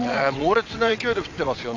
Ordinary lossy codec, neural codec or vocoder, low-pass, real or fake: MP3, 64 kbps; vocoder, 22.05 kHz, 80 mel bands, WaveNeXt; 7.2 kHz; fake